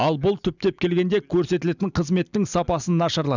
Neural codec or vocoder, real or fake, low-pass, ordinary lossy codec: none; real; 7.2 kHz; none